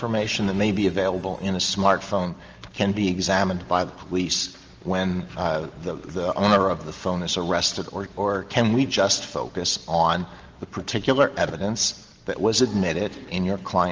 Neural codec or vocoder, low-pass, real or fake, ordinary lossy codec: none; 7.2 kHz; real; Opus, 24 kbps